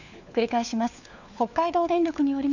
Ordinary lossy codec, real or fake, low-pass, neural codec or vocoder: none; fake; 7.2 kHz; codec, 16 kHz, 4 kbps, FunCodec, trained on LibriTTS, 50 frames a second